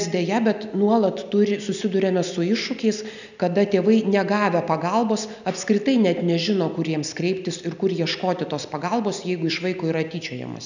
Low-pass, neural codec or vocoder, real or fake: 7.2 kHz; none; real